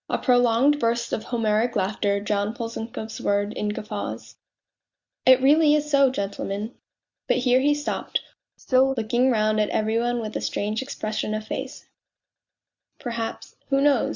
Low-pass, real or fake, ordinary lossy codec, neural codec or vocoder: 7.2 kHz; real; Opus, 64 kbps; none